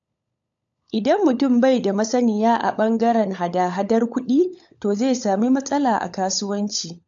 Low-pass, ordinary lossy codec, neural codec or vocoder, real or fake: 7.2 kHz; AAC, 64 kbps; codec, 16 kHz, 16 kbps, FunCodec, trained on LibriTTS, 50 frames a second; fake